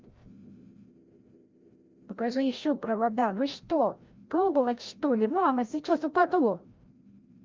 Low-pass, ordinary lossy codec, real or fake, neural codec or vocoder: 7.2 kHz; Opus, 32 kbps; fake; codec, 16 kHz, 0.5 kbps, FreqCodec, larger model